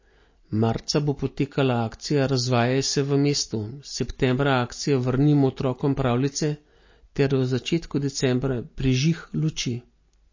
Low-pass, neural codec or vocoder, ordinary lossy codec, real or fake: 7.2 kHz; none; MP3, 32 kbps; real